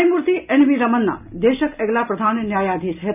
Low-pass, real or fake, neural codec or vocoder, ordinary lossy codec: 3.6 kHz; real; none; none